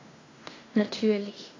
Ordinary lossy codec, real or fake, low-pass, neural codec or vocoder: AAC, 32 kbps; fake; 7.2 kHz; codec, 16 kHz in and 24 kHz out, 0.9 kbps, LongCat-Audio-Codec, fine tuned four codebook decoder